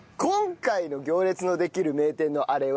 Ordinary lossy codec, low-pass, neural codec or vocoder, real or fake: none; none; none; real